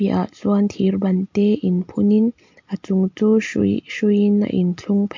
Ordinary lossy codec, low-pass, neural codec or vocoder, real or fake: MP3, 48 kbps; 7.2 kHz; none; real